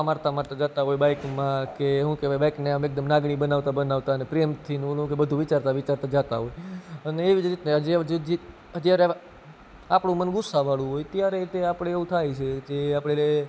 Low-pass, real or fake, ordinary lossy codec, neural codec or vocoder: none; real; none; none